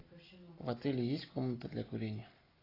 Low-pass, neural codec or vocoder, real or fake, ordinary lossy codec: 5.4 kHz; none; real; AAC, 24 kbps